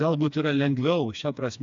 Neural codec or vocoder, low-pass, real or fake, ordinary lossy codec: codec, 16 kHz, 2 kbps, FreqCodec, smaller model; 7.2 kHz; fake; AAC, 64 kbps